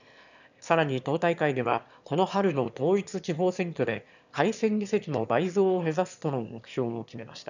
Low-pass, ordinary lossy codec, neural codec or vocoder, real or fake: 7.2 kHz; none; autoencoder, 22.05 kHz, a latent of 192 numbers a frame, VITS, trained on one speaker; fake